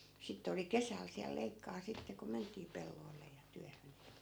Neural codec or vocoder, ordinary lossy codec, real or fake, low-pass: none; none; real; none